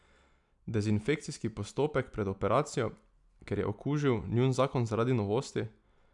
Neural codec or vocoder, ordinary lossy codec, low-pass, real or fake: none; none; 10.8 kHz; real